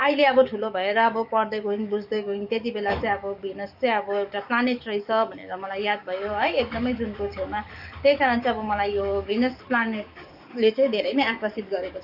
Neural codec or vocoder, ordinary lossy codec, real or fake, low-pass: codec, 44.1 kHz, 7.8 kbps, DAC; none; fake; 5.4 kHz